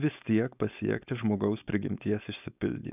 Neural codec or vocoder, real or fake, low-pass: codec, 16 kHz, 4.8 kbps, FACodec; fake; 3.6 kHz